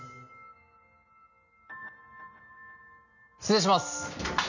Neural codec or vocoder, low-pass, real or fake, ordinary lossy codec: none; 7.2 kHz; real; none